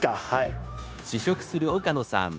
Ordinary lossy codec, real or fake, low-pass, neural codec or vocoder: none; fake; none; codec, 16 kHz, 0.9 kbps, LongCat-Audio-Codec